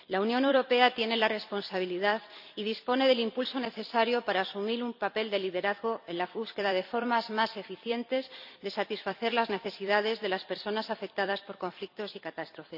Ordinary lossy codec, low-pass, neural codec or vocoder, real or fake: none; 5.4 kHz; vocoder, 44.1 kHz, 128 mel bands every 256 samples, BigVGAN v2; fake